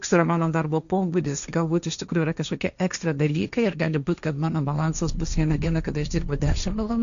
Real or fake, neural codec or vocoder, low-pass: fake; codec, 16 kHz, 1.1 kbps, Voila-Tokenizer; 7.2 kHz